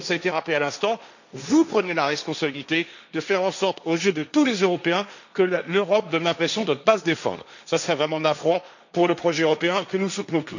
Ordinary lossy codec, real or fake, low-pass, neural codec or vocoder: none; fake; 7.2 kHz; codec, 16 kHz, 1.1 kbps, Voila-Tokenizer